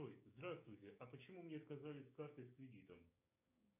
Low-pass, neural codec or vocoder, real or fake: 3.6 kHz; codec, 16 kHz, 6 kbps, DAC; fake